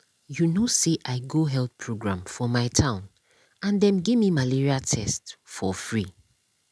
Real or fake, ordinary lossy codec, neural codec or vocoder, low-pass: real; none; none; none